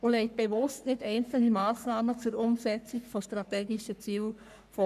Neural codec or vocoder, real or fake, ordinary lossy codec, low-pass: codec, 44.1 kHz, 3.4 kbps, Pupu-Codec; fake; none; 14.4 kHz